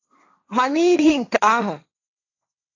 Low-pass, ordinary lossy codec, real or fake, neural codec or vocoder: 7.2 kHz; AAC, 48 kbps; fake; codec, 16 kHz, 1.1 kbps, Voila-Tokenizer